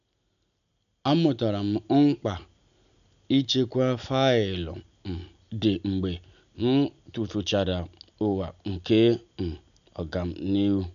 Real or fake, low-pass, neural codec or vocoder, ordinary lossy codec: real; 7.2 kHz; none; none